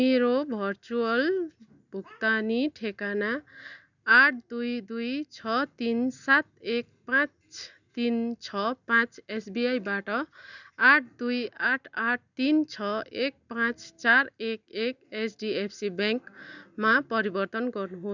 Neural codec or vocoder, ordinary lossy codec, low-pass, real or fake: none; none; 7.2 kHz; real